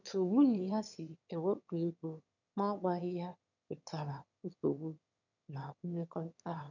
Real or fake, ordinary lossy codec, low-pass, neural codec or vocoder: fake; none; 7.2 kHz; autoencoder, 22.05 kHz, a latent of 192 numbers a frame, VITS, trained on one speaker